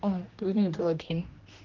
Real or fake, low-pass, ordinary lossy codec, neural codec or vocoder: fake; 7.2 kHz; Opus, 24 kbps; codec, 16 kHz, 1 kbps, X-Codec, HuBERT features, trained on general audio